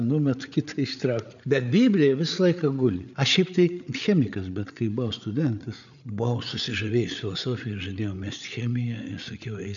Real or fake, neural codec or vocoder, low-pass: fake; codec, 16 kHz, 8 kbps, FreqCodec, larger model; 7.2 kHz